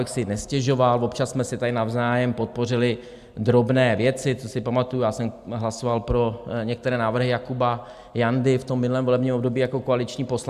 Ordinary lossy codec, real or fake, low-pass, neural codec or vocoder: AAC, 96 kbps; real; 14.4 kHz; none